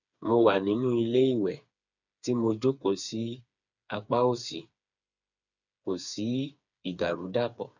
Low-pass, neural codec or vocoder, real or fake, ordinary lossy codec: 7.2 kHz; codec, 16 kHz, 4 kbps, FreqCodec, smaller model; fake; none